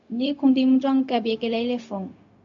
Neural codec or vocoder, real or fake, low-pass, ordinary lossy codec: codec, 16 kHz, 0.4 kbps, LongCat-Audio-Codec; fake; 7.2 kHz; MP3, 48 kbps